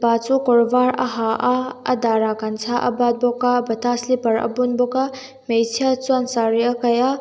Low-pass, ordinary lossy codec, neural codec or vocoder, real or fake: none; none; none; real